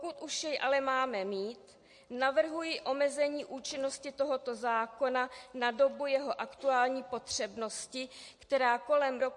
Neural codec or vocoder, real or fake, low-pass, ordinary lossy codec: none; real; 10.8 kHz; MP3, 48 kbps